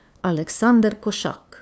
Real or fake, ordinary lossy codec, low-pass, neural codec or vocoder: fake; none; none; codec, 16 kHz, 2 kbps, FunCodec, trained on LibriTTS, 25 frames a second